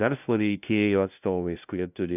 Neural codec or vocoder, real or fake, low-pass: codec, 16 kHz, 0.5 kbps, FunCodec, trained on LibriTTS, 25 frames a second; fake; 3.6 kHz